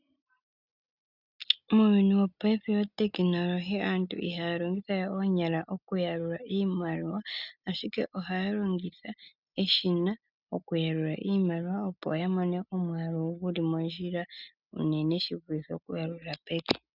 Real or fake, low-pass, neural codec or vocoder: real; 5.4 kHz; none